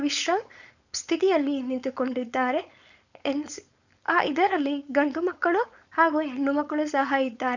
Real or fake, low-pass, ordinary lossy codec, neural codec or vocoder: fake; 7.2 kHz; none; codec, 16 kHz, 4.8 kbps, FACodec